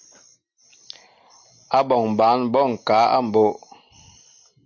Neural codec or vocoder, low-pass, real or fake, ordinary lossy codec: none; 7.2 kHz; real; MP3, 48 kbps